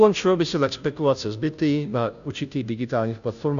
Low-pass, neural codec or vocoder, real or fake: 7.2 kHz; codec, 16 kHz, 0.5 kbps, FunCodec, trained on Chinese and English, 25 frames a second; fake